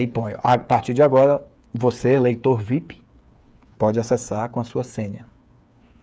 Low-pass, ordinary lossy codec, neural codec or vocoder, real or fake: none; none; codec, 16 kHz, 8 kbps, FreqCodec, smaller model; fake